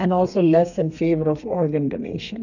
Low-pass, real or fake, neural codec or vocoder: 7.2 kHz; fake; codec, 32 kHz, 1.9 kbps, SNAC